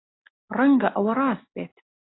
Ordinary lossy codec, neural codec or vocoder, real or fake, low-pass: AAC, 16 kbps; none; real; 7.2 kHz